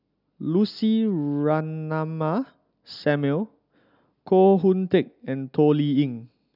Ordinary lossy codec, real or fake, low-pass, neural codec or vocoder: none; real; 5.4 kHz; none